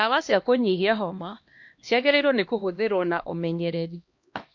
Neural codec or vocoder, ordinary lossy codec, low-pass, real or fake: codec, 16 kHz, 1 kbps, X-Codec, HuBERT features, trained on LibriSpeech; MP3, 48 kbps; 7.2 kHz; fake